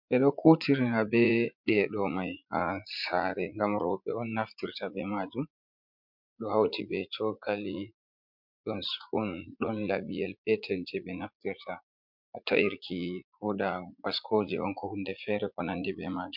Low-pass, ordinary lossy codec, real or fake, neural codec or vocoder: 5.4 kHz; MP3, 48 kbps; fake; vocoder, 44.1 kHz, 80 mel bands, Vocos